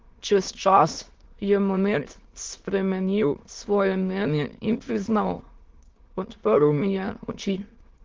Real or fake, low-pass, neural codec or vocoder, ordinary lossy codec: fake; 7.2 kHz; autoencoder, 22.05 kHz, a latent of 192 numbers a frame, VITS, trained on many speakers; Opus, 16 kbps